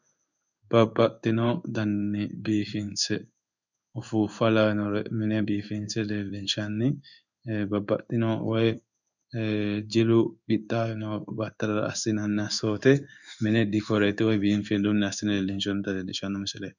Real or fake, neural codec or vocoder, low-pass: fake; codec, 16 kHz in and 24 kHz out, 1 kbps, XY-Tokenizer; 7.2 kHz